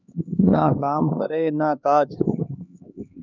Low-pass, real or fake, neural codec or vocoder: 7.2 kHz; fake; codec, 16 kHz, 2 kbps, X-Codec, HuBERT features, trained on LibriSpeech